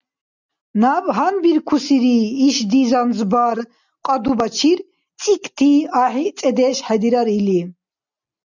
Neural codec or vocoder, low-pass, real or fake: none; 7.2 kHz; real